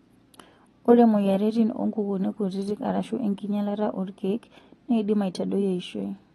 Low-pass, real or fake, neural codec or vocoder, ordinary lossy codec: 19.8 kHz; real; none; AAC, 32 kbps